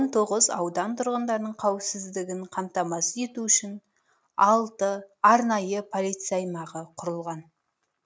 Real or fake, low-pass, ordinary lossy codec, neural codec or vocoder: real; none; none; none